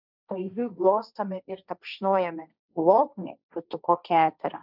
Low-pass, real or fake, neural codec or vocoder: 5.4 kHz; fake; codec, 16 kHz, 1.1 kbps, Voila-Tokenizer